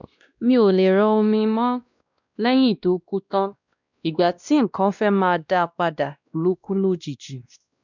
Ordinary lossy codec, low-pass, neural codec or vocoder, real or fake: none; 7.2 kHz; codec, 16 kHz, 1 kbps, X-Codec, WavLM features, trained on Multilingual LibriSpeech; fake